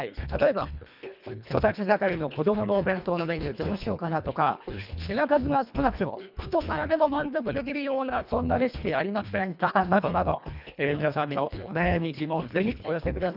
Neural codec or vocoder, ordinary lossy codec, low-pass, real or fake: codec, 24 kHz, 1.5 kbps, HILCodec; none; 5.4 kHz; fake